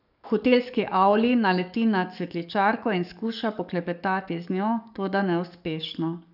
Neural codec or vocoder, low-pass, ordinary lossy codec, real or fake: codec, 44.1 kHz, 7.8 kbps, DAC; 5.4 kHz; none; fake